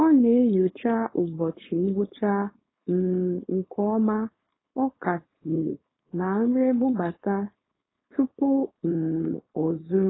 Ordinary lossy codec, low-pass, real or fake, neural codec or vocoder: AAC, 16 kbps; 7.2 kHz; fake; codec, 16 kHz, 4.8 kbps, FACodec